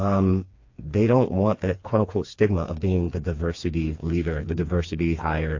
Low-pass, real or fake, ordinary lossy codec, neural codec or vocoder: 7.2 kHz; fake; AAC, 48 kbps; codec, 16 kHz, 4 kbps, FreqCodec, smaller model